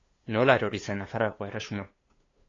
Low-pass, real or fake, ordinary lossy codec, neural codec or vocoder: 7.2 kHz; fake; AAC, 32 kbps; codec, 16 kHz, 2 kbps, FunCodec, trained on LibriTTS, 25 frames a second